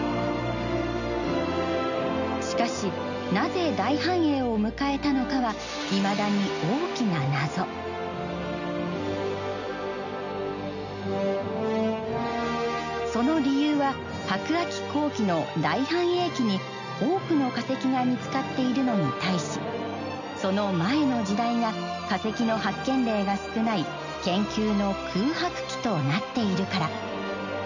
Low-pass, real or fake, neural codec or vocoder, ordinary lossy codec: 7.2 kHz; real; none; none